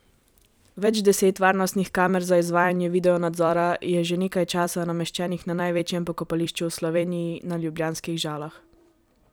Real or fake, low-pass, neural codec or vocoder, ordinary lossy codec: fake; none; vocoder, 44.1 kHz, 128 mel bands every 256 samples, BigVGAN v2; none